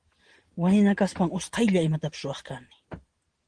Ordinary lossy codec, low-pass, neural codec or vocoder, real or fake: Opus, 16 kbps; 9.9 kHz; none; real